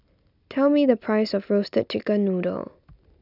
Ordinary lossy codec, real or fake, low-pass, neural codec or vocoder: none; real; 5.4 kHz; none